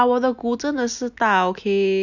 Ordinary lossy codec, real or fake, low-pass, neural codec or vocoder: none; real; 7.2 kHz; none